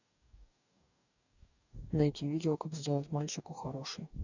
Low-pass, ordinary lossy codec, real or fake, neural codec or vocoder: 7.2 kHz; none; fake; codec, 44.1 kHz, 2.6 kbps, DAC